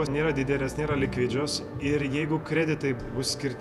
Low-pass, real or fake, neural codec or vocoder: 14.4 kHz; fake; vocoder, 48 kHz, 128 mel bands, Vocos